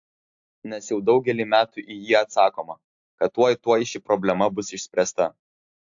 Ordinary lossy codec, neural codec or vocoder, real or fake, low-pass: AAC, 64 kbps; none; real; 7.2 kHz